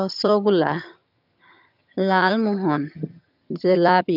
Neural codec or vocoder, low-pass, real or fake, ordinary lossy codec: vocoder, 22.05 kHz, 80 mel bands, HiFi-GAN; 5.4 kHz; fake; none